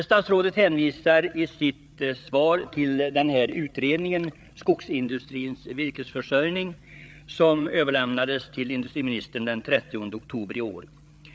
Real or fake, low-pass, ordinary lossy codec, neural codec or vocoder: fake; none; none; codec, 16 kHz, 8 kbps, FreqCodec, larger model